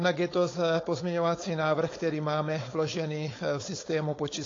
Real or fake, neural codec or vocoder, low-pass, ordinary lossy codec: fake; codec, 16 kHz, 4.8 kbps, FACodec; 7.2 kHz; AAC, 32 kbps